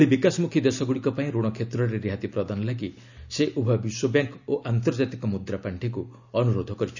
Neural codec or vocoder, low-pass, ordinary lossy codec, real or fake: none; 7.2 kHz; none; real